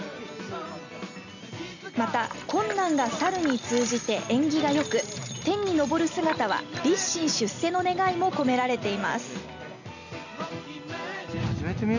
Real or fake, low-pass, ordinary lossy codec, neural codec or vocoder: real; 7.2 kHz; none; none